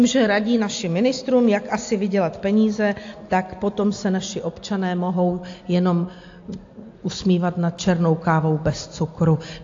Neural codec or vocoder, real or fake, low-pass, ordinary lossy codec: none; real; 7.2 kHz; AAC, 48 kbps